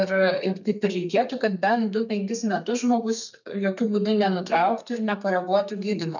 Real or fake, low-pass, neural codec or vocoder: fake; 7.2 kHz; codec, 32 kHz, 1.9 kbps, SNAC